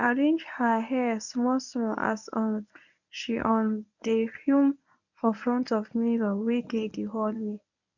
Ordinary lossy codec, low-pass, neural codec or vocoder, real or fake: none; 7.2 kHz; codec, 24 kHz, 0.9 kbps, WavTokenizer, medium speech release version 1; fake